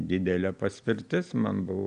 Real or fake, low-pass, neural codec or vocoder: real; 9.9 kHz; none